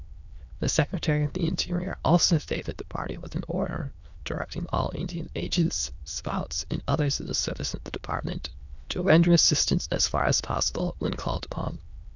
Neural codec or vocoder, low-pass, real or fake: autoencoder, 22.05 kHz, a latent of 192 numbers a frame, VITS, trained on many speakers; 7.2 kHz; fake